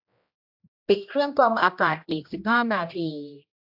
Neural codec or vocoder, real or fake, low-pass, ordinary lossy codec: codec, 16 kHz, 1 kbps, X-Codec, HuBERT features, trained on general audio; fake; 5.4 kHz; AAC, 48 kbps